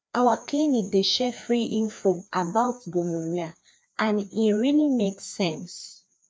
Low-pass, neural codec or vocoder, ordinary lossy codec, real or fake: none; codec, 16 kHz, 2 kbps, FreqCodec, larger model; none; fake